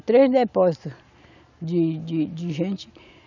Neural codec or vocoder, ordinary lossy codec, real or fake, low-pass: none; none; real; 7.2 kHz